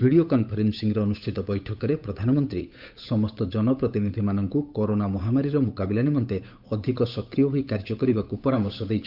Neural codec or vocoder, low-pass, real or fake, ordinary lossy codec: codec, 16 kHz, 8 kbps, FunCodec, trained on Chinese and English, 25 frames a second; 5.4 kHz; fake; Opus, 64 kbps